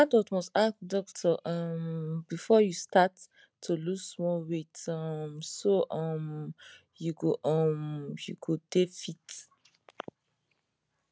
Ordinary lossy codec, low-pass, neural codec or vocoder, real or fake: none; none; none; real